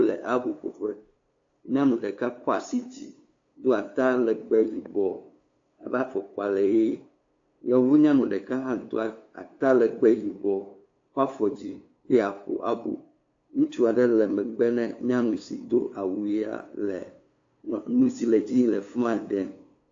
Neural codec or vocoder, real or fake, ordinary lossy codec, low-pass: codec, 16 kHz, 2 kbps, FunCodec, trained on LibriTTS, 25 frames a second; fake; AAC, 48 kbps; 7.2 kHz